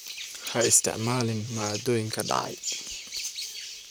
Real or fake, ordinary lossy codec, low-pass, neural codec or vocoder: fake; none; none; vocoder, 44.1 kHz, 128 mel bands, Pupu-Vocoder